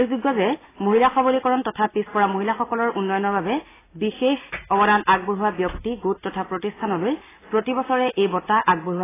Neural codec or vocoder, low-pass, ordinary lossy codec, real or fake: none; 3.6 kHz; AAC, 16 kbps; real